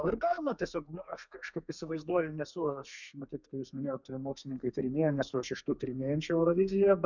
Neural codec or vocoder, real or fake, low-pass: codec, 32 kHz, 1.9 kbps, SNAC; fake; 7.2 kHz